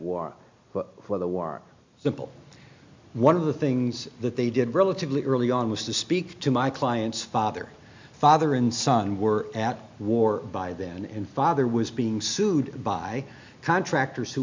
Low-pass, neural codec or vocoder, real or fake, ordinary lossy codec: 7.2 kHz; none; real; MP3, 64 kbps